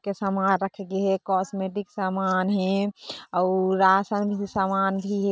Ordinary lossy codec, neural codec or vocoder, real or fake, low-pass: none; none; real; none